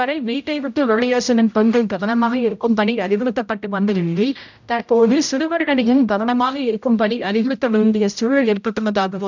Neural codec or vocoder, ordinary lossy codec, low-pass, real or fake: codec, 16 kHz, 0.5 kbps, X-Codec, HuBERT features, trained on general audio; none; 7.2 kHz; fake